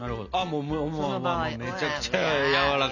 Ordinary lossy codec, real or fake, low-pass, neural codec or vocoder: none; real; 7.2 kHz; none